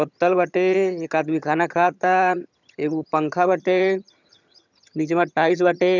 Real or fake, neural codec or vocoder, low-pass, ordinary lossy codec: fake; vocoder, 22.05 kHz, 80 mel bands, HiFi-GAN; 7.2 kHz; none